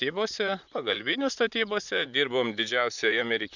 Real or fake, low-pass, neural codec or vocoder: fake; 7.2 kHz; vocoder, 44.1 kHz, 128 mel bands, Pupu-Vocoder